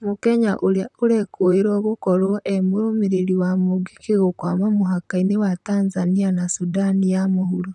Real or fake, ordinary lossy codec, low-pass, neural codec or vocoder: fake; none; 10.8 kHz; vocoder, 44.1 kHz, 128 mel bands, Pupu-Vocoder